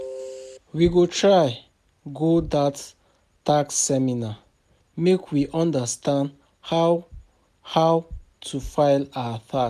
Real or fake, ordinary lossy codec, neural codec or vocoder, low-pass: real; none; none; 14.4 kHz